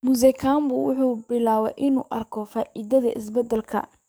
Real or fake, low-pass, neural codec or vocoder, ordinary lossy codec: fake; none; codec, 44.1 kHz, 7.8 kbps, DAC; none